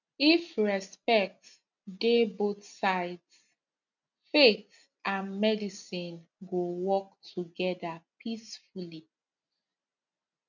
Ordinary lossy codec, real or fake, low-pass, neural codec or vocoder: none; real; 7.2 kHz; none